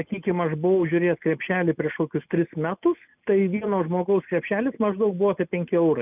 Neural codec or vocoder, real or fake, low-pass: none; real; 3.6 kHz